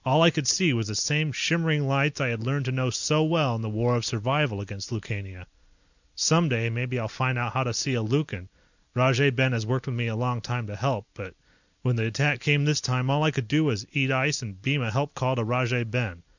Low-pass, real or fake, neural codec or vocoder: 7.2 kHz; real; none